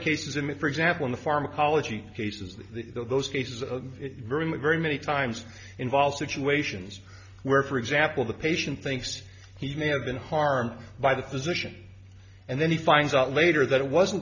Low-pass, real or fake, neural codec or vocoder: 7.2 kHz; real; none